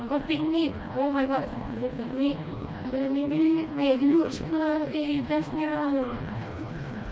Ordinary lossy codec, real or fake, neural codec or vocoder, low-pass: none; fake; codec, 16 kHz, 1 kbps, FreqCodec, smaller model; none